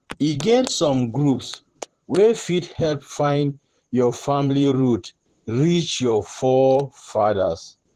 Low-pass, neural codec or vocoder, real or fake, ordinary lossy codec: 14.4 kHz; vocoder, 44.1 kHz, 128 mel bands, Pupu-Vocoder; fake; Opus, 16 kbps